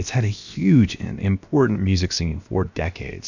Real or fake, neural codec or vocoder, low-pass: fake; codec, 16 kHz, about 1 kbps, DyCAST, with the encoder's durations; 7.2 kHz